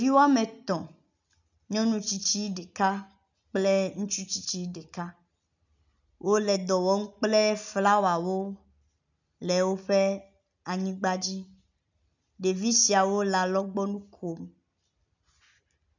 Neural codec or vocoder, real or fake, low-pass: none; real; 7.2 kHz